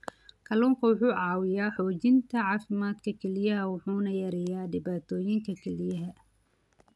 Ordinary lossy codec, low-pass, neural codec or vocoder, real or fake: none; none; none; real